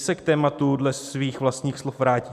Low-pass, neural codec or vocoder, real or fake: 14.4 kHz; none; real